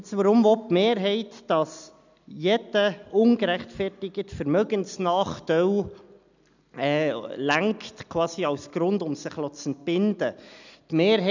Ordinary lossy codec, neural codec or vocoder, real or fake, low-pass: none; none; real; 7.2 kHz